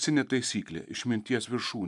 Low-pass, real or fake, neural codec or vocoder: 10.8 kHz; real; none